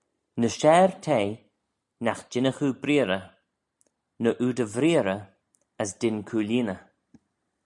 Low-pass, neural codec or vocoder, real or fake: 10.8 kHz; none; real